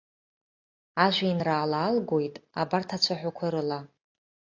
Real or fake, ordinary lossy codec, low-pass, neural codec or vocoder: real; AAC, 48 kbps; 7.2 kHz; none